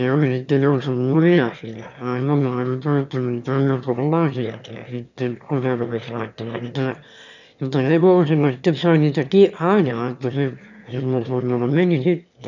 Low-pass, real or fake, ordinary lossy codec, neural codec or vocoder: 7.2 kHz; fake; none; autoencoder, 22.05 kHz, a latent of 192 numbers a frame, VITS, trained on one speaker